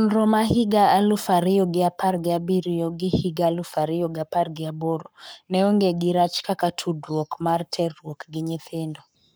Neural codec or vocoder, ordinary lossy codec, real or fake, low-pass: codec, 44.1 kHz, 7.8 kbps, Pupu-Codec; none; fake; none